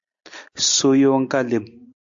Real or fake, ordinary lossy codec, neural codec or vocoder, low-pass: real; AAC, 64 kbps; none; 7.2 kHz